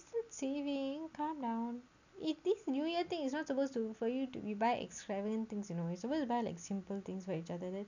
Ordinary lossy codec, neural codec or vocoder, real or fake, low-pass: none; none; real; 7.2 kHz